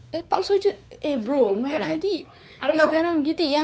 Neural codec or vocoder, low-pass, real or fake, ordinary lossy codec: codec, 16 kHz, 4 kbps, X-Codec, WavLM features, trained on Multilingual LibriSpeech; none; fake; none